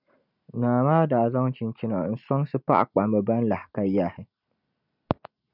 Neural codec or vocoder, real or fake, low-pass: none; real; 5.4 kHz